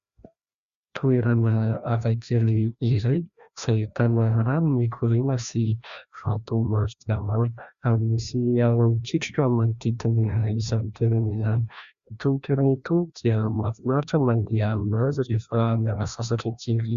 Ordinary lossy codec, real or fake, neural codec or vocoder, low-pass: Opus, 64 kbps; fake; codec, 16 kHz, 1 kbps, FreqCodec, larger model; 7.2 kHz